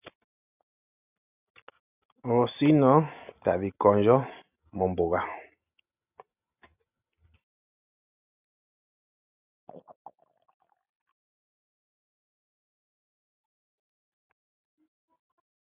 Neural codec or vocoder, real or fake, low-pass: none; real; 3.6 kHz